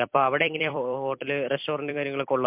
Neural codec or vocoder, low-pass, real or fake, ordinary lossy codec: vocoder, 44.1 kHz, 128 mel bands every 256 samples, BigVGAN v2; 3.6 kHz; fake; MP3, 32 kbps